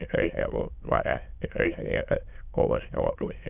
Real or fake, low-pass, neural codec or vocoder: fake; 3.6 kHz; autoencoder, 22.05 kHz, a latent of 192 numbers a frame, VITS, trained on many speakers